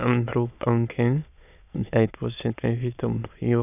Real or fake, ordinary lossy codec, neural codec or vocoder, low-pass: fake; none; autoencoder, 22.05 kHz, a latent of 192 numbers a frame, VITS, trained on many speakers; 3.6 kHz